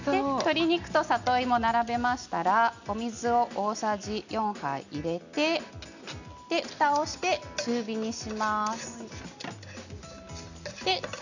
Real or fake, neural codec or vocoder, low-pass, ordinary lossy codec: real; none; 7.2 kHz; none